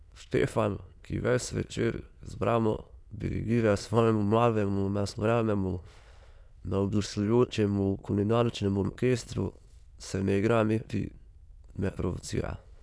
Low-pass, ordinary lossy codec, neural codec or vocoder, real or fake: none; none; autoencoder, 22.05 kHz, a latent of 192 numbers a frame, VITS, trained on many speakers; fake